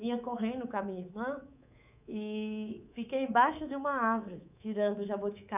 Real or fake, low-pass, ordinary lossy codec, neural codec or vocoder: fake; 3.6 kHz; none; codec, 24 kHz, 3.1 kbps, DualCodec